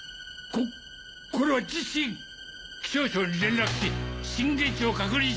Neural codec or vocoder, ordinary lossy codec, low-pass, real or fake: none; none; none; real